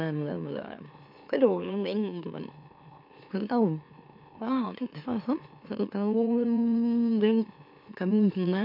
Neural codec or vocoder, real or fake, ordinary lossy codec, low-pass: autoencoder, 44.1 kHz, a latent of 192 numbers a frame, MeloTTS; fake; MP3, 48 kbps; 5.4 kHz